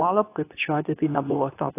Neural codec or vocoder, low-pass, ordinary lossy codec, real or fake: codec, 24 kHz, 0.9 kbps, WavTokenizer, medium speech release version 2; 3.6 kHz; AAC, 24 kbps; fake